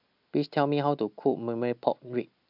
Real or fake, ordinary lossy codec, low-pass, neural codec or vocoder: real; MP3, 48 kbps; 5.4 kHz; none